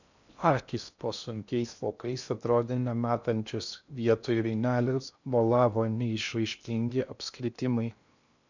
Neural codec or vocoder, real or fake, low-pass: codec, 16 kHz in and 24 kHz out, 0.6 kbps, FocalCodec, streaming, 2048 codes; fake; 7.2 kHz